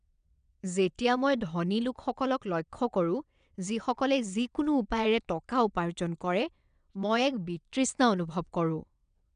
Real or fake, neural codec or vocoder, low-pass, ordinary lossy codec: fake; vocoder, 22.05 kHz, 80 mel bands, WaveNeXt; 9.9 kHz; none